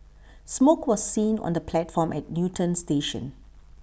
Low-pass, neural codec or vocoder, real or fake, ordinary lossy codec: none; none; real; none